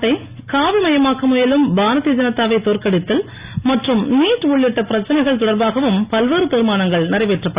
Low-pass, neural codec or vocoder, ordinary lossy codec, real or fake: 3.6 kHz; none; Opus, 64 kbps; real